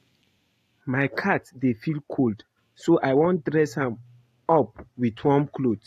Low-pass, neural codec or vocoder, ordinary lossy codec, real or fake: 14.4 kHz; none; AAC, 48 kbps; real